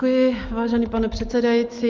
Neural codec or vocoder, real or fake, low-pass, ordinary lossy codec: none; real; 7.2 kHz; Opus, 32 kbps